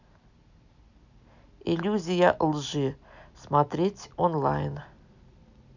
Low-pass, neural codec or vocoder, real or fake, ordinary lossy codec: 7.2 kHz; none; real; none